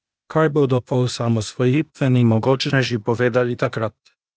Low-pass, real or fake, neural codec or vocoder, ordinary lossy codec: none; fake; codec, 16 kHz, 0.8 kbps, ZipCodec; none